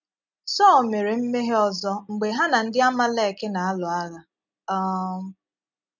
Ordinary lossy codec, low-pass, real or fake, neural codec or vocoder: none; 7.2 kHz; real; none